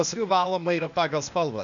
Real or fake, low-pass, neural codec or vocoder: fake; 7.2 kHz; codec, 16 kHz, 0.8 kbps, ZipCodec